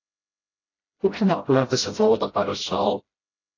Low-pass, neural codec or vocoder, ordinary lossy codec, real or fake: 7.2 kHz; codec, 16 kHz, 0.5 kbps, FreqCodec, smaller model; AAC, 32 kbps; fake